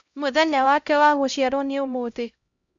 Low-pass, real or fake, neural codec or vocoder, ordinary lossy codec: 7.2 kHz; fake; codec, 16 kHz, 0.5 kbps, X-Codec, HuBERT features, trained on LibriSpeech; Opus, 64 kbps